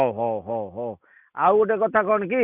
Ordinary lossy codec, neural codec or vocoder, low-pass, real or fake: none; none; 3.6 kHz; real